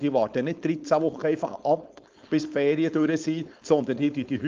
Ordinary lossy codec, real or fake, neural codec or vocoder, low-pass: Opus, 32 kbps; fake; codec, 16 kHz, 4.8 kbps, FACodec; 7.2 kHz